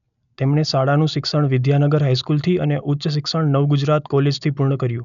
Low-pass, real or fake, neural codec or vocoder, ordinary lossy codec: 7.2 kHz; real; none; none